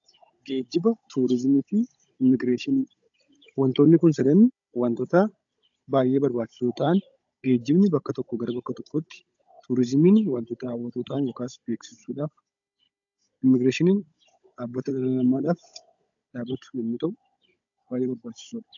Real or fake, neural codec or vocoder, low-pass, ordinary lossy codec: fake; codec, 16 kHz, 16 kbps, FunCodec, trained on Chinese and English, 50 frames a second; 7.2 kHz; AAC, 64 kbps